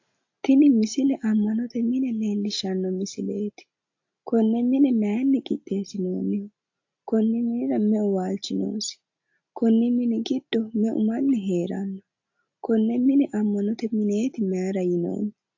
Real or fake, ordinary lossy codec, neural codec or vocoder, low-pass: real; AAC, 48 kbps; none; 7.2 kHz